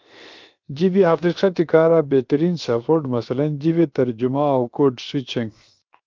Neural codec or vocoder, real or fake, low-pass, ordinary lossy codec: codec, 16 kHz, 0.7 kbps, FocalCodec; fake; 7.2 kHz; Opus, 32 kbps